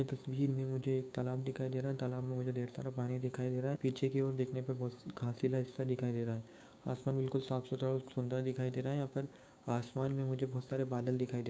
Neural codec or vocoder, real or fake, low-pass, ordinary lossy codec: codec, 16 kHz, 6 kbps, DAC; fake; none; none